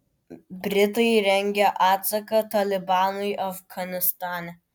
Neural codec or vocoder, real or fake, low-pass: none; real; 19.8 kHz